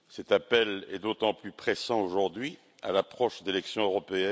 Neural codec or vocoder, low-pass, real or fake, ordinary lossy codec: none; none; real; none